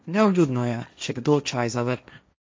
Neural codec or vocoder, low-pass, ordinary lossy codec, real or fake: codec, 16 kHz, 1.1 kbps, Voila-Tokenizer; none; none; fake